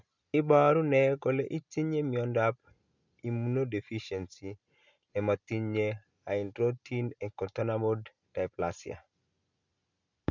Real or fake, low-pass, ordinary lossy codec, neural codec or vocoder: real; 7.2 kHz; none; none